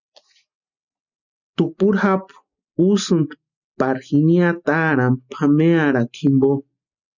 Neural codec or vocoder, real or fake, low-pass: none; real; 7.2 kHz